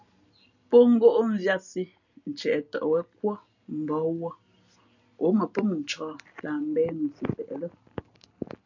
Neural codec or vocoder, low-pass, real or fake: none; 7.2 kHz; real